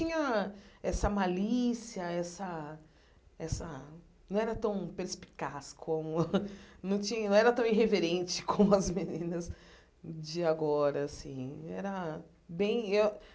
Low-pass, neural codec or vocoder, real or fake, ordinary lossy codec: none; none; real; none